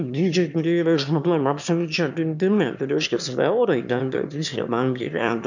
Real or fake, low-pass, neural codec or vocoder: fake; 7.2 kHz; autoencoder, 22.05 kHz, a latent of 192 numbers a frame, VITS, trained on one speaker